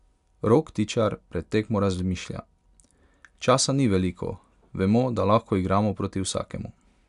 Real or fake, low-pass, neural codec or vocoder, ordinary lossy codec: real; 10.8 kHz; none; none